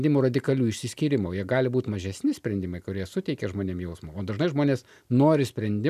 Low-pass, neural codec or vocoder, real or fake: 14.4 kHz; none; real